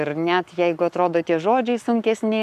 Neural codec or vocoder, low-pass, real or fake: codec, 44.1 kHz, 7.8 kbps, Pupu-Codec; 14.4 kHz; fake